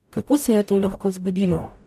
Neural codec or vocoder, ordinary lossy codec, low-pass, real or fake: codec, 44.1 kHz, 0.9 kbps, DAC; AAC, 96 kbps; 14.4 kHz; fake